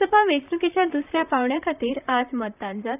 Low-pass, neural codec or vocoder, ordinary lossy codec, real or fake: 3.6 kHz; vocoder, 44.1 kHz, 128 mel bands, Pupu-Vocoder; none; fake